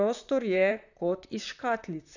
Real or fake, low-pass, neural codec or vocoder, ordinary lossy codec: fake; 7.2 kHz; vocoder, 44.1 kHz, 128 mel bands, Pupu-Vocoder; none